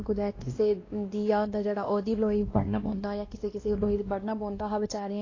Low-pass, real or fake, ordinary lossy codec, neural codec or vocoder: 7.2 kHz; fake; AAC, 32 kbps; codec, 16 kHz, 1 kbps, X-Codec, WavLM features, trained on Multilingual LibriSpeech